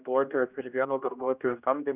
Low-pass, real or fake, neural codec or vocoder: 3.6 kHz; fake; codec, 16 kHz, 1 kbps, X-Codec, HuBERT features, trained on general audio